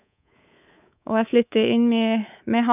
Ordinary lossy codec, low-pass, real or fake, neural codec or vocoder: none; 3.6 kHz; fake; codec, 24 kHz, 3.1 kbps, DualCodec